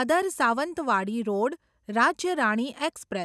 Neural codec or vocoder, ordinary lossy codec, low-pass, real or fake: none; none; none; real